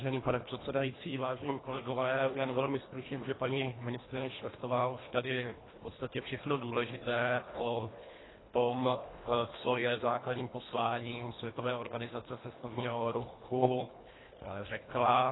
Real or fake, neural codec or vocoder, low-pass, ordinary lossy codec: fake; codec, 24 kHz, 1.5 kbps, HILCodec; 7.2 kHz; AAC, 16 kbps